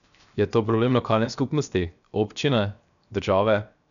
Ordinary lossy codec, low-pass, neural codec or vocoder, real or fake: none; 7.2 kHz; codec, 16 kHz, 0.7 kbps, FocalCodec; fake